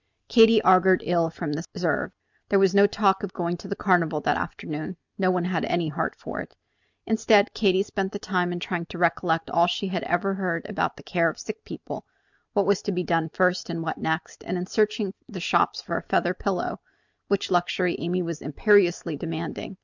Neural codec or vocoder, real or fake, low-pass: none; real; 7.2 kHz